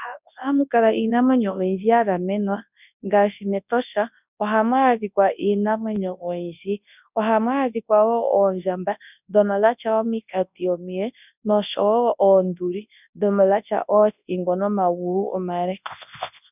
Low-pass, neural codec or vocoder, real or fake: 3.6 kHz; codec, 24 kHz, 0.9 kbps, WavTokenizer, large speech release; fake